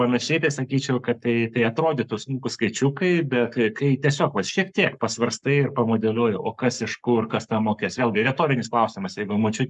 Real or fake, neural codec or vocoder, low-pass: fake; codec, 44.1 kHz, 7.8 kbps, Pupu-Codec; 10.8 kHz